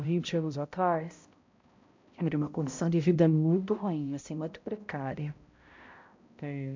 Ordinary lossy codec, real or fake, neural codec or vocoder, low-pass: MP3, 64 kbps; fake; codec, 16 kHz, 0.5 kbps, X-Codec, HuBERT features, trained on balanced general audio; 7.2 kHz